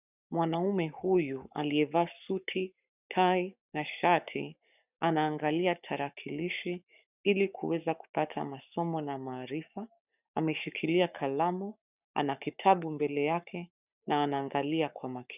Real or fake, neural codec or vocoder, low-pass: fake; codec, 44.1 kHz, 7.8 kbps, Pupu-Codec; 3.6 kHz